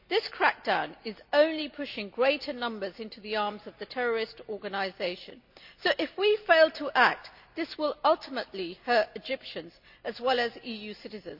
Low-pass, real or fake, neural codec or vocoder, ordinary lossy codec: 5.4 kHz; real; none; none